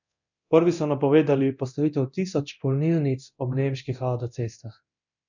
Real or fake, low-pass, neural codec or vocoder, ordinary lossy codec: fake; 7.2 kHz; codec, 24 kHz, 0.9 kbps, DualCodec; none